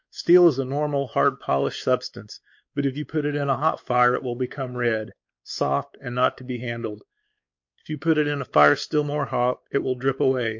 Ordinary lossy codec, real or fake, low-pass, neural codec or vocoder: MP3, 48 kbps; fake; 7.2 kHz; codec, 16 kHz, 4 kbps, X-Codec, WavLM features, trained on Multilingual LibriSpeech